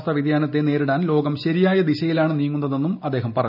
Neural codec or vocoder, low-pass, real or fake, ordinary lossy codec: none; 5.4 kHz; real; none